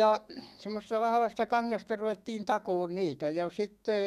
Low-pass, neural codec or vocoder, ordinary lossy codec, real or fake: 14.4 kHz; codec, 32 kHz, 1.9 kbps, SNAC; none; fake